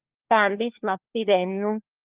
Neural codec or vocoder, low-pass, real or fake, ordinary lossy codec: codec, 16 kHz, 1 kbps, FunCodec, trained on LibriTTS, 50 frames a second; 3.6 kHz; fake; Opus, 32 kbps